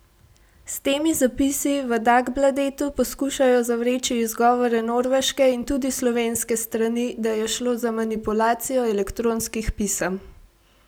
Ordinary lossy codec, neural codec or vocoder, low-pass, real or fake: none; none; none; real